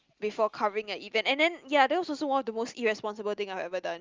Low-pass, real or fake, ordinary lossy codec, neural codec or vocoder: 7.2 kHz; real; Opus, 32 kbps; none